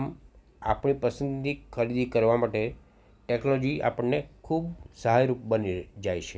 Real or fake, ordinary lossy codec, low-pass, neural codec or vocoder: real; none; none; none